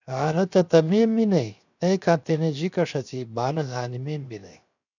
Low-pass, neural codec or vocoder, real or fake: 7.2 kHz; codec, 16 kHz, 0.7 kbps, FocalCodec; fake